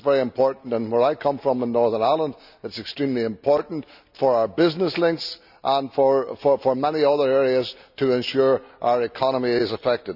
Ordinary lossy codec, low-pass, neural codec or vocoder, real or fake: none; 5.4 kHz; none; real